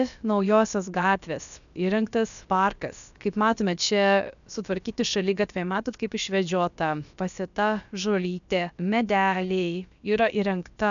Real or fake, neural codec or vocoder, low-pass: fake; codec, 16 kHz, about 1 kbps, DyCAST, with the encoder's durations; 7.2 kHz